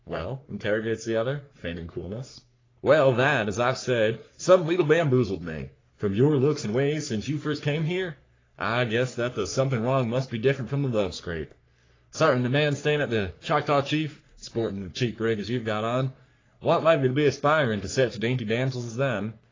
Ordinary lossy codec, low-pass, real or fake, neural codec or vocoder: AAC, 32 kbps; 7.2 kHz; fake; codec, 44.1 kHz, 3.4 kbps, Pupu-Codec